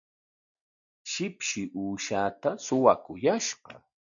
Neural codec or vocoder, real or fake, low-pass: none; real; 7.2 kHz